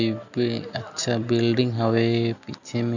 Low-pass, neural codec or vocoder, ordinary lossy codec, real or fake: 7.2 kHz; none; none; real